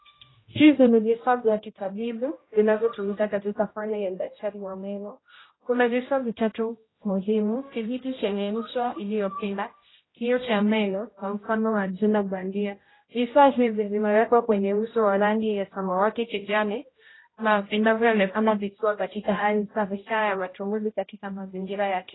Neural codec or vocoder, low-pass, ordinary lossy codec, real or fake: codec, 16 kHz, 0.5 kbps, X-Codec, HuBERT features, trained on general audio; 7.2 kHz; AAC, 16 kbps; fake